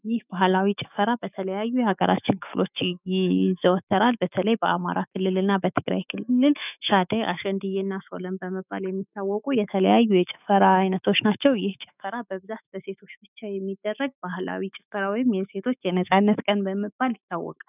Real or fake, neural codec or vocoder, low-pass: fake; autoencoder, 48 kHz, 128 numbers a frame, DAC-VAE, trained on Japanese speech; 3.6 kHz